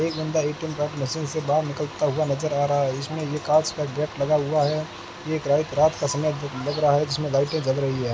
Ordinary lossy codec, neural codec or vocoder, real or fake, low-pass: none; none; real; none